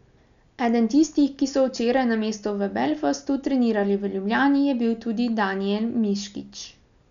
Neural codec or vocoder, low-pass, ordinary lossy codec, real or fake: none; 7.2 kHz; MP3, 96 kbps; real